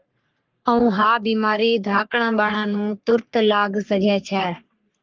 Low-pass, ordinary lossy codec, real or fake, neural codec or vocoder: 7.2 kHz; Opus, 24 kbps; fake; codec, 44.1 kHz, 3.4 kbps, Pupu-Codec